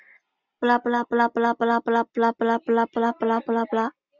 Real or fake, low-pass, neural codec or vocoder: real; 7.2 kHz; none